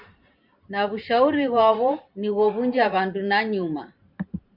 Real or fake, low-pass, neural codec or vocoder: fake; 5.4 kHz; vocoder, 44.1 kHz, 128 mel bands every 512 samples, BigVGAN v2